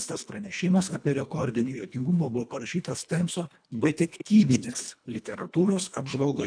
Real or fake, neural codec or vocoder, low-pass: fake; codec, 24 kHz, 1.5 kbps, HILCodec; 9.9 kHz